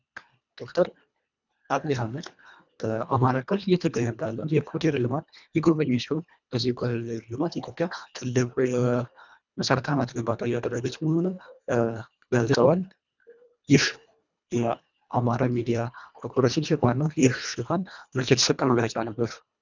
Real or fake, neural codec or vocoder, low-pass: fake; codec, 24 kHz, 1.5 kbps, HILCodec; 7.2 kHz